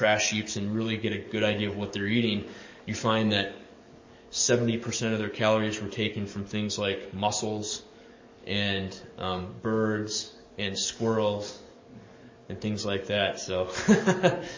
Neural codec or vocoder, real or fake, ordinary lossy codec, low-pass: codec, 44.1 kHz, 7.8 kbps, DAC; fake; MP3, 32 kbps; 7.2 kHz